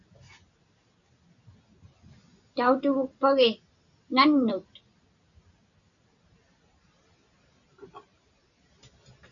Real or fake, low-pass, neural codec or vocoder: real; 7.2 kHz; none